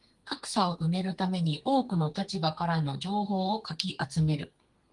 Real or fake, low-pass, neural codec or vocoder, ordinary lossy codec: fake; 10.8 kHz; codec, 32 kHz, 1.9 kbps, SNAC; Opus, 24 kbps